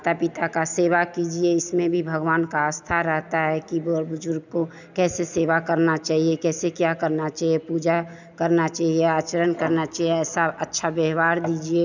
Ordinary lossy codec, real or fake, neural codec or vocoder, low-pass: none; real; none; 7.2 kHz